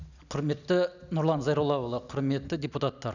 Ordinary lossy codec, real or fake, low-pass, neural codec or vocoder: none; real; 7.2 kHz; none